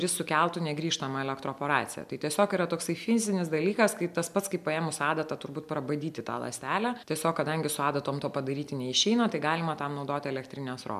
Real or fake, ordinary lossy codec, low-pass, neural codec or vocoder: real; MP3, 96 kbps; 14.4 kHz; none